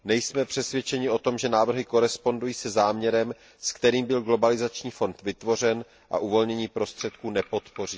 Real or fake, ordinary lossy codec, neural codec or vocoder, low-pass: real; none; none; none